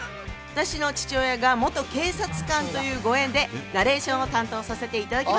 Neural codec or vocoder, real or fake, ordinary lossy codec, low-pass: none; real; none; none